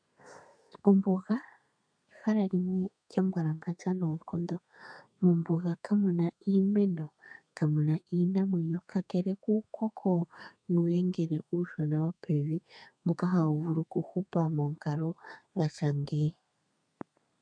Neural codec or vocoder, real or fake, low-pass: codec, 32 kHz, 1.9 kbps, SNAC; fake; 9.9 kHz